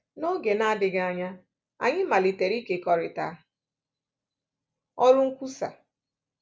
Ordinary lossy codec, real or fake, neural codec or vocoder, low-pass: none; real; none; none